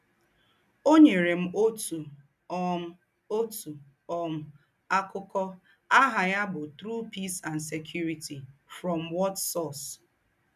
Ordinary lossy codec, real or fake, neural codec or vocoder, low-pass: none; real; none; 14.4 kHz